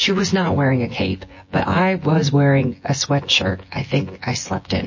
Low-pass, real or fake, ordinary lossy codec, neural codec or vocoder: 7.2 kHz; fake; MP3, 32 kbps; vocoder, 24 kHz, 100 mel bands, Vocos